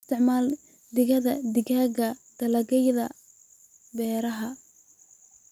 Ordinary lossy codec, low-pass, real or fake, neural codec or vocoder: none; 19.8 kHz; real; none